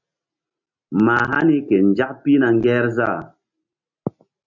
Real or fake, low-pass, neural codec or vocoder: real; 7.2 kHz; none